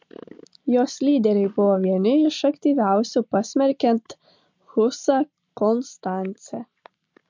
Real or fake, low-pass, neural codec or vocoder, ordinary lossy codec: real; 7.2 kHz; none; MP3, 48 kbps